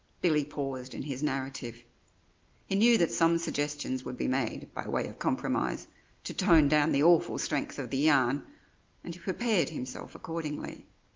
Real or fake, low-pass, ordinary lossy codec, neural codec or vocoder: real; 7.2 kHz; Opus, 32 kbps; none